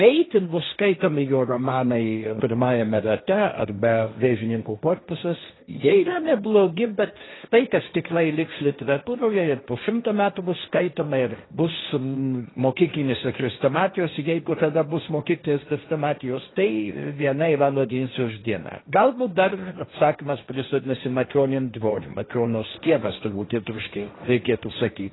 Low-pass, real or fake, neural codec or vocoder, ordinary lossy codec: 7.2 kHz; fake; codec, 16 kHz, 1.1 kbps, Voila-Tokenizer; AAC, 16 kbps